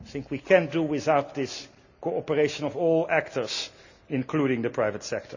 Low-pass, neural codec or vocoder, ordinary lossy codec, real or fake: 7.2 kHz; none; none; real